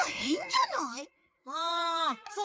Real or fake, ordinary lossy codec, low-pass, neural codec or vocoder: fake; none; none; codec, 16 kHz, 4 kbps, FreqCodec, larger model